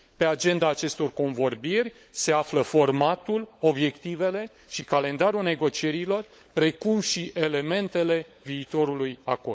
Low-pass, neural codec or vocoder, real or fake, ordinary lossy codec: none; codec, 16 kHz, 16 kbps, FunCodec, trained on LibriTTS, 50 frames a second; fake; none